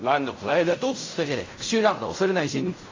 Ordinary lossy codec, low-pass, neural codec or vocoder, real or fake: AAC, 32 kbps; 7.2 kHz; codec, 16 kHz in and 24 kHz out, 0.4 kbps, LongCat-Audio-Codec, fine tuned four codebook decoder; fake